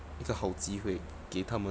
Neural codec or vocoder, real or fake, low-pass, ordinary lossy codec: none; real; none; none